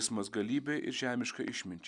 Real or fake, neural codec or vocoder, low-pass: real; none; 10.8 kHz